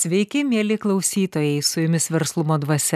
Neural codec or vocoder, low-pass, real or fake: none; 14.4 kHz; real